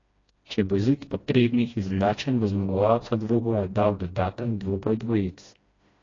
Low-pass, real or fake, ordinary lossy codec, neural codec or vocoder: 7.2 kHz; fake; AAC, 32 kbps; codec, 16 kHz, 1 kbps, FreqCodec, smaller model